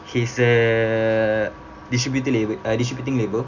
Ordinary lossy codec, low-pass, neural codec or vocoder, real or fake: none; 7.2 kHz; none; real